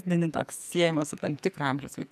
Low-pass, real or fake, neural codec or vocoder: 14.4 kHz; fake; codec, 44.1 kHz, 2.6 kbps, SNAC